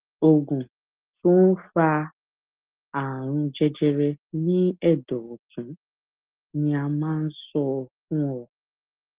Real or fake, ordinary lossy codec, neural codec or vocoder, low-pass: real; Opus, 16 kbps; none; 3.6 kHz